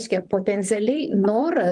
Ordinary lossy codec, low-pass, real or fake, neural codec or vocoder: Opus, 32 kbps; 10.8 kHz; fake; vocoder, 44.1 kHz, 128 mel bands, Pupu-Vocoder